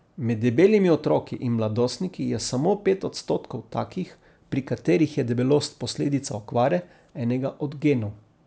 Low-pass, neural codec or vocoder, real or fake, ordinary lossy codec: none; none; real; none